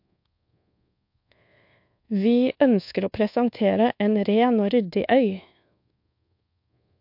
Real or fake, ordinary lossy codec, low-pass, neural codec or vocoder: fake; MP3, 48 kbps; 5.4 kHz; codec, 24 kHz, 1.2 kbps, DualCodec